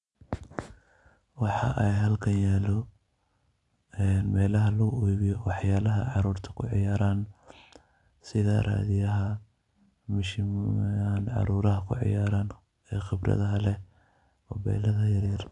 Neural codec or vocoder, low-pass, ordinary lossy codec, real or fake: none; 10.8 kHz; AAC, 64 kbps; real